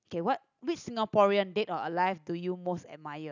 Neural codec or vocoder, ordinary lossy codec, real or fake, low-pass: none; none; real; 7.2 kHz